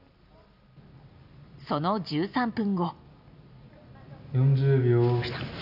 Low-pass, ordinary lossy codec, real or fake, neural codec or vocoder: 5.4 kHz; none; real; none